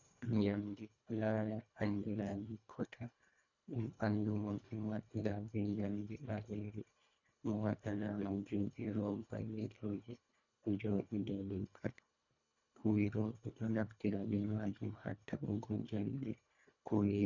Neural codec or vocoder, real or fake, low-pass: codec, 24 kHz, 1.5 kbps, HILCodec; fake; 7.2 kHz